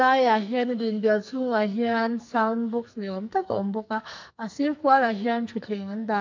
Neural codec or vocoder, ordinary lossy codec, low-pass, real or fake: codec, 44.1 kHz, 2.6 kbps, SNAC; MP3, 64 kbps; 7.2 kHz; fake